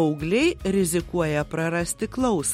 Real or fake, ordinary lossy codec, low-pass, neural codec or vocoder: real; MP3, 64 kbps; 19.8 kHz; none